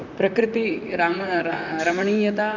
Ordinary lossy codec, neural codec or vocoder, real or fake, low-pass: none; vocoder, 44.1 kHz, 128 mel bands, Pupu-Vocoder; fake; 7.2 kHz